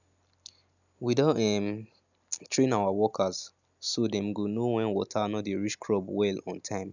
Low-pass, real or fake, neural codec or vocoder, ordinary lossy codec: 7.2 kHz; real; none; none